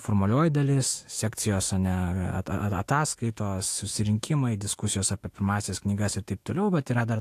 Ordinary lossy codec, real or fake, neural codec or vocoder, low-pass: AAC, 64 kbps; fake; autoencoder, 48 kHz, 128 numbers a frame, DAC-VAE, trained on Japanese speech; 14.4 kHz